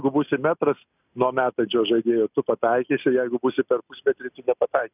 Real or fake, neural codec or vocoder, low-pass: real; none; 3.6 kHz